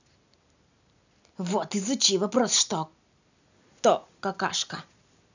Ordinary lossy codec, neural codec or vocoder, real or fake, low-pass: none; none; real; 7.2 kHz